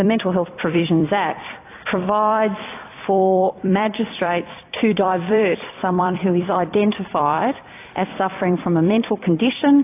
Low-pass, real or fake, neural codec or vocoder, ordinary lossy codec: 3.6 kHz; fake; vocoder, 44.1 kHz, 128 mel bands every 256 samples, BigVGAN v2; AAC, 24 kbps